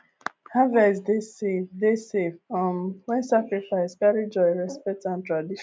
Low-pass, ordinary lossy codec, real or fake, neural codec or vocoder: none; none; real; none